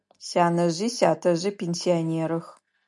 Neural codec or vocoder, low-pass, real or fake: none; 10.8 kHz; real